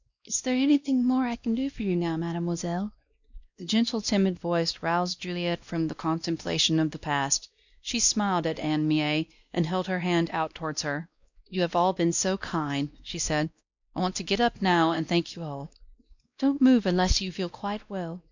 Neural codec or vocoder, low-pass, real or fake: codec, 16 kHz, 1 kbps, X-Codec, WavLM features, trained on Multilingual LibriSpeech; 7.2 kHz; fake